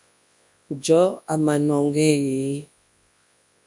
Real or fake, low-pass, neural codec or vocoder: fake; 10.8 kHz; codec, 24 kHz, 0.9 kbps, WavTokenizer, large speech release